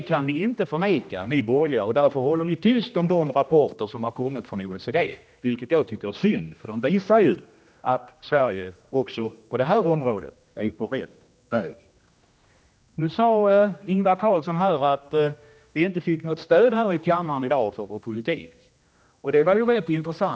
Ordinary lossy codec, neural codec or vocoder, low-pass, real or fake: none; codec, 16 kHz, 1 kbps, X-Codec, HuBERT features, trained on general audio; none; fake